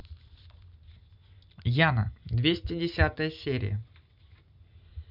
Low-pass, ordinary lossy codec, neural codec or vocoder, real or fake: 5.4 kHz; none; none; real